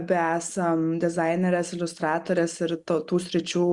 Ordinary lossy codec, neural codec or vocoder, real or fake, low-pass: Opus, 64 kbps; none; real; 10.8 kHz